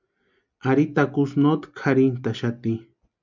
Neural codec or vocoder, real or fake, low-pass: none; real; 7.2 kHz